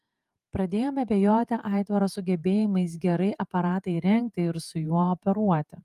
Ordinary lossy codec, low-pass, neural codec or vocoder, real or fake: Opus, 32 kbps; 14.4 kHz; vocoder, 48 kHz, 128 mel bands, Vocos; fake